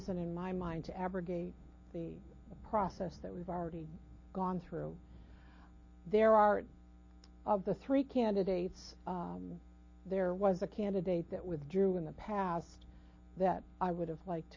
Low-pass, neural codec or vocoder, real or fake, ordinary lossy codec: 7.2 kHz; none; real; MP3, 32 kbps